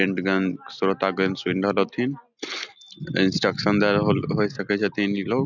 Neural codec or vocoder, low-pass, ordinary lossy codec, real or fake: none; 7.2 kHz; none; real